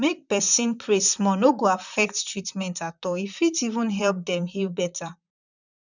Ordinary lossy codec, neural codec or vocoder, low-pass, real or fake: none; vocoder, 44.1 kHz, 128 mel bands, Pupu-Vocoder; 7.2 kHz; fake